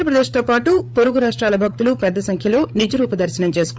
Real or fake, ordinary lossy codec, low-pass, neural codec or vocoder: fake; none; none; codec, 16 kHz, 8 kbps, FreqCodec, larger model